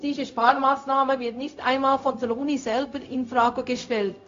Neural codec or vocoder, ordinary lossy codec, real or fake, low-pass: codec, 16 kHz, 0.4 kbps, LongCat-Audio-Codec; AAC, 48 kbps; fake; 7.2 kHz